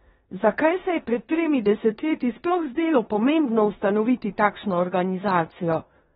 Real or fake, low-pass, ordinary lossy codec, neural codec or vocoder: fake; 10.8 kHz; AAC, 16 kbps; codec, 16 kHz in and 24 kHz out, 0.9 kbps, LongCat-Audio-Codec, four codebook decoder